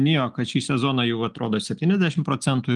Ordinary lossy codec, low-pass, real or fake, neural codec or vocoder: Opus, 24 kbps; 10.8 kHz; real; none